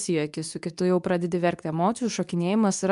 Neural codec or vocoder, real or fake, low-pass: codec, 24 kHz, 0.9 kbps, WavTokenizer, medium speech release version 2; fake; 10.8 kHz